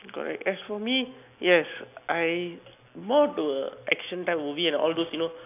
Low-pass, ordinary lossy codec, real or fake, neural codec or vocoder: 3.6 kHz; none; real; none